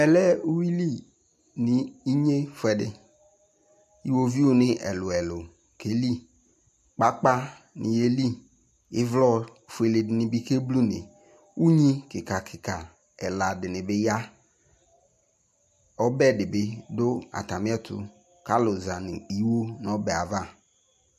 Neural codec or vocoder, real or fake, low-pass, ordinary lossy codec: none; real; 14.4 kHz; MP3, 64 kbps